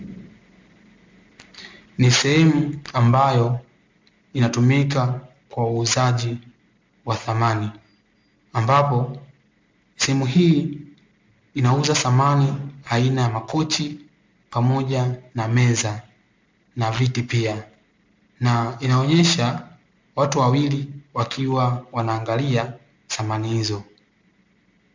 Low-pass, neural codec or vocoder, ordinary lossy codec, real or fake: 7.2 kHz; none; MP3, 64 kbps; real